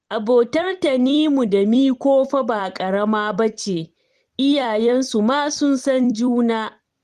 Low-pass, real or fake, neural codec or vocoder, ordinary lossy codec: 14.4 kHz; fake; vocoder, 44.1 kHz, 128 mel bands every 256 samples, BigVGAN v2; Opus, 24 kbps